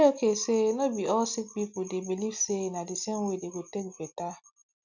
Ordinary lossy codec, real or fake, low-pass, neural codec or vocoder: none; real; 7.2 kHz; none